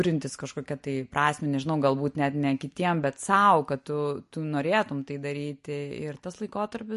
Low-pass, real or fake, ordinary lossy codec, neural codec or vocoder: 14.4 kHz; real; MP3, 48 kbps; none